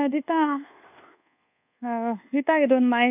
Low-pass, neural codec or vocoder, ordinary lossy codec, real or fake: 3.6 kHz; codec, 24 kHz, 1.2 kbps, DualCodec; none; fake